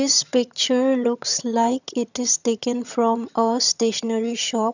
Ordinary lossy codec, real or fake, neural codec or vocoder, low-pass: none; fake; vocoder, 22.05 kHz, 80 mel bands, HiFi-GAN; 7.2 kHz